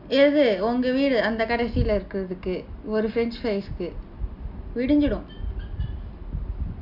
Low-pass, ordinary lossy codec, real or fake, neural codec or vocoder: 5.4 kHz; none; real; none